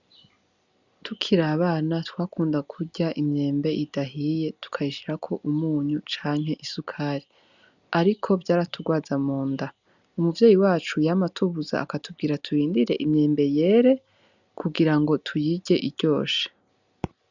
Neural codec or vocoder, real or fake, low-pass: none; real; 7.2 kHz